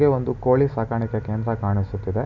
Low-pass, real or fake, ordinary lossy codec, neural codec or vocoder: 7.2 kHz; real; none; none